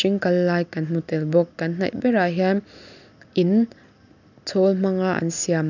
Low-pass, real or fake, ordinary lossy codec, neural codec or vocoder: 7.2 kHz; real; none; none